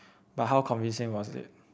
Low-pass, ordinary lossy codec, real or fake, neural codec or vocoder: none; none; real; none